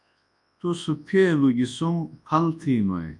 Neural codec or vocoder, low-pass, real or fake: codec, 24 kHz, 0.9 kbps, WavTokenizer, large speech release; 10.8 kHz; fake